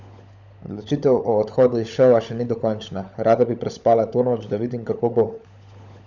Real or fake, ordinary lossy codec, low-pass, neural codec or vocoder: fake; none; 7.2 kHz; codec, 16 kHz, 16 kbps, FunCodec, trained on LibriTTS, 50 frames a second